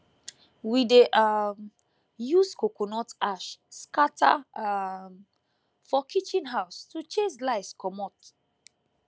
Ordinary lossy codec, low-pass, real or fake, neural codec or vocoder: none; none; real; none